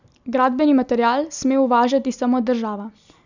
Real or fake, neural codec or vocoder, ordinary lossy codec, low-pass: real; none; none; 7.2 kHz